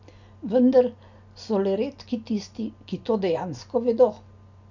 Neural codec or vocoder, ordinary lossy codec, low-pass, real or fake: none; none; 7.2 kHz; real